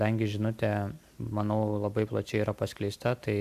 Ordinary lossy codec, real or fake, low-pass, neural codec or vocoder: AAC, 96 kbps; real; 14.4 kHz; none